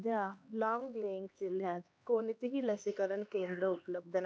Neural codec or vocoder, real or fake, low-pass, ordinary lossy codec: codec, 16 kHz, 2 kbps, X-Codec, HuBERT features, trained on LibriSpeech; fake; none; none